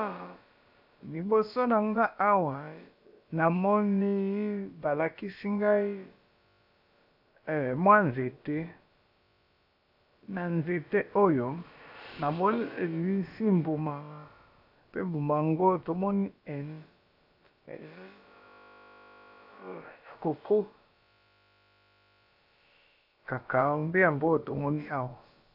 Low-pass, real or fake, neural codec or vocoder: 5.4 kHz; fake; codec, 16 kHz, about 1 kbps, DyCAST, with the encoder's durations